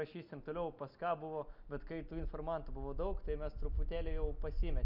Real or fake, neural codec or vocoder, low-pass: real; none; 5.4 kHz